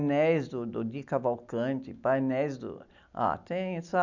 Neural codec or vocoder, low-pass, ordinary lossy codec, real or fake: none; 7.2 kHz; none; real